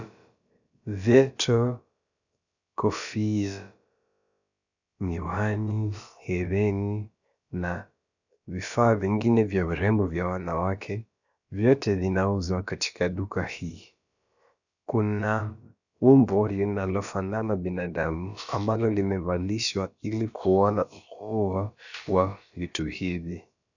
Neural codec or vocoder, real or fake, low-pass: codec, 16 kHz, about 1 kbps, DyCAST, with the encoder's durations; fake; 7.2 kHz